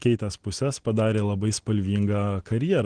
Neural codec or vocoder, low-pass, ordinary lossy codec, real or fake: none; 9.9 kHz; Opus, 16 kbps; real